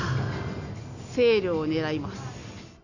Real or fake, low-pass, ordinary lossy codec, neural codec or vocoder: real; 7.2 kHz; none; none